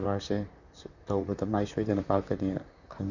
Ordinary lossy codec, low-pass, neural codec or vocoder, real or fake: none; 7.2 kHz; vocoder, 44.1 kHz, 128 mel bands, Pupu-Vocoder; fake